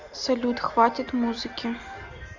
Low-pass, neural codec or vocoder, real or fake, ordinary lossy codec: 7.2 kHz; none; real; Opus, 64 kbps